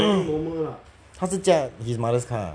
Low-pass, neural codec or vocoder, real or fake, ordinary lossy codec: 9.9 kHz; none; real; none